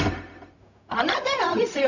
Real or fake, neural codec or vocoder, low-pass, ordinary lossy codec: fake; codec, 16 kHz, 0.4 kbps, LongCat-Audio-Codec; 7.2 kHz; none